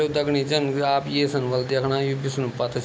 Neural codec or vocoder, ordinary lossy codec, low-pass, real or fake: none; none; none; real